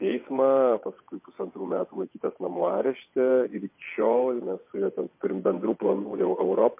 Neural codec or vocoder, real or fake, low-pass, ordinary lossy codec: vocoder, 22.05 kHz, 80 mel bands, WaveNeXt; fake; 3.6 kHz; MP3, 24 kbps